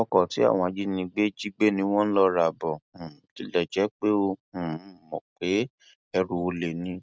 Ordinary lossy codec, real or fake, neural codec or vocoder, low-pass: none; real; none; none